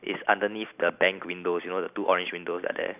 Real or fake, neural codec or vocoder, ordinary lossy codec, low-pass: real; none; none; 3.6 kHz